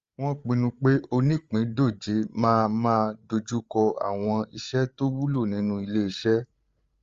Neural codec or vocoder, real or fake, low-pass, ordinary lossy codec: codec, 16 kHz, 8 kbps, FreqCodec, larger model; fake; 7.2 kHz; Opus, 32 kbps